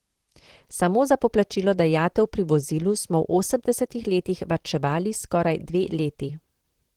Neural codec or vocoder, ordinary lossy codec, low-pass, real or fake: vocoder, 44.1 kHz, 128 mel bands, Pupu-Vocoder; Opus, 16 kbps; 19.8 kHz; fake